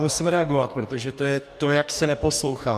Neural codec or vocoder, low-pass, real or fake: codec, 44.1 kHz, 2.6 kbps, DAC; 14.4 kHz; fake